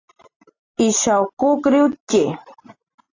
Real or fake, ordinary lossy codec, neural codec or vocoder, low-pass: real; AAC, 32 kbps; none; 7.2 kHz